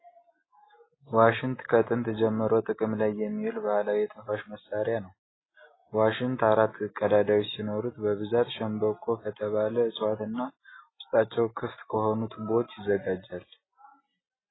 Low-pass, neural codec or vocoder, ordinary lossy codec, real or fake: 7.2 kHz; none; AAC, 16 kbps; real